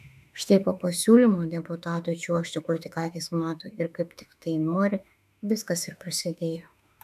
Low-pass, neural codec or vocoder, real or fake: 14.4 kHz; autoencoder, 48 kHz, 32 numbers a frame, DAC-VAE, trained on Japanese speech; fake